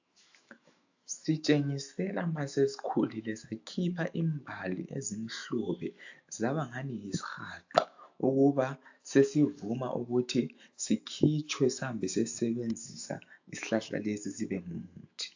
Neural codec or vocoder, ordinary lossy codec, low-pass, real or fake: autoencoder, 48 kHz, 128 numbers a frame, DAC-VAE, trained on Japanese speech; AAC, 48 kbps; 7.2 kHz; fake